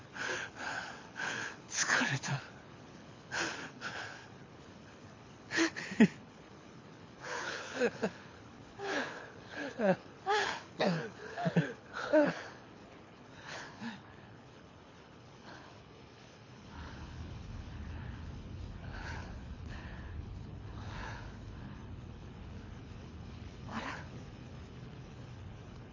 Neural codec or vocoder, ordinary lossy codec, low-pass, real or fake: codec, 24 kHz, 6 kbps, HILCodec; MP3, 32 kbps; 7.2 kHz; fake